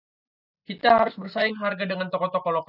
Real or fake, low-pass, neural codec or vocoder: real; 5.4 kHz; none